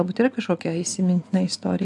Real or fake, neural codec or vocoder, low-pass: real; none; 10.8 kHz